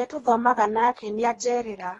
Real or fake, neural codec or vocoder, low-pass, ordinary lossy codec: fake; codec, 24 kHz, 3 kbps, HILCodec; 10.8 kHz; AAC, 32 kbps